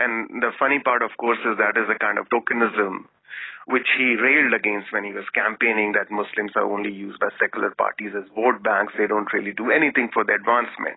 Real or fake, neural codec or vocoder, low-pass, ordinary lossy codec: real; none; 7.2 kHz; AAC, 16 kbps